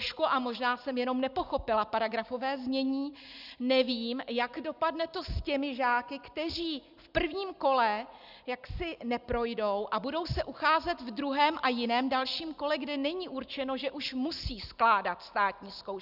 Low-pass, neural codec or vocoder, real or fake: 5.4 kHz; none; real